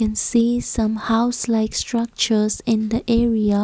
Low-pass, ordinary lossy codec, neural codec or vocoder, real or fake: none; none; none; real